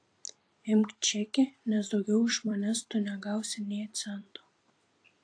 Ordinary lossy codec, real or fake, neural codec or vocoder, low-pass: AAC, 48 kbps; fake; vocoder, 44.1 kHz, 128 mel bands every 256 samples, BigVGAN v2; 9.9 kHz